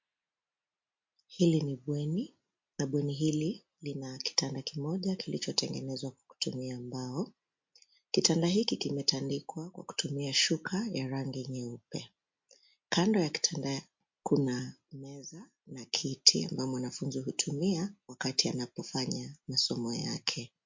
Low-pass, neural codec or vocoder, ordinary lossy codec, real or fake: 7.2 kHz; none; MP3, 48 kbps; real